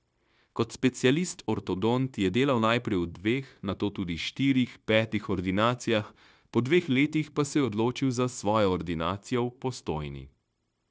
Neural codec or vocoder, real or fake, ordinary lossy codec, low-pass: codec, 16 kHz, 0.9 kbps, LongCat-Audio-Codec; fake; none; none